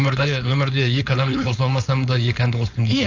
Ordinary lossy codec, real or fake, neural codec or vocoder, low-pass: AAC, 48 kbps; fake; codec, 16 kHz, 4.8 kbps, FACodec; 7.2 kHz